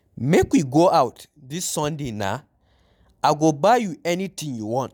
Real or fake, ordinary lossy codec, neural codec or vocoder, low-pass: real; none; none; 19.8 kHz